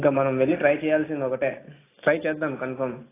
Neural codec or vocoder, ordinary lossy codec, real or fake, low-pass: none; AAC, 16 kbps; real; 3.6 kHz